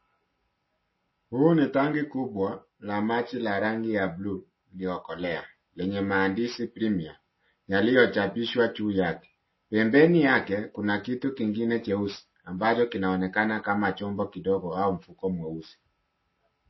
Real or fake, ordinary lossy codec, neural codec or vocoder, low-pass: real; MP3, 24 kbps; none; 7.2 kHz